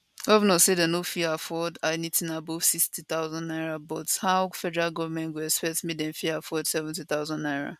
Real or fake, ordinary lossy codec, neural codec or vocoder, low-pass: real; none; none; 14.4 kHz